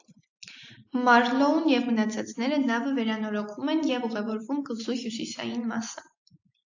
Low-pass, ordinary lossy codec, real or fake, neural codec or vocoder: 7.2 kHz; AAC, 48 kbps; real; none